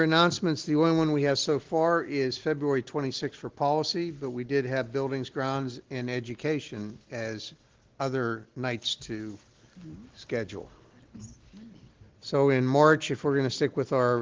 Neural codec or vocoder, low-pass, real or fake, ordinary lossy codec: none; 7.2 kHz; real; Opus, 16 kbps